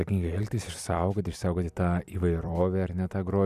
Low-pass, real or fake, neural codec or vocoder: 14.4 kHz; fake; vocoder, 44.1 kHz, 128 mel bands every 256 samples, BigVGAN v2